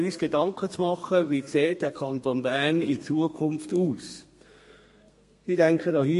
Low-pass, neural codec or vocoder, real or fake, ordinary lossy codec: 14.4 kHz; codec, 32 kHz, 1.9 kbps, SNAC; fake; MP3, 48 kbps